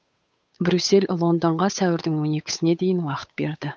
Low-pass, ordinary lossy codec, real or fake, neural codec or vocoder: none; none; fake; codec, 16 kHz, 8 kbps, FunCodec, trained on Chinese and English, 25 frames a second